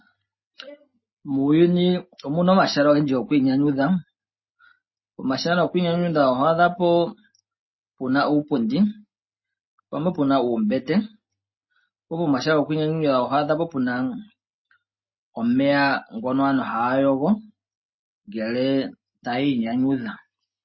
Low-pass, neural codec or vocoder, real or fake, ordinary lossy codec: 7.2 kHz; none; real; MP3, 24 kbps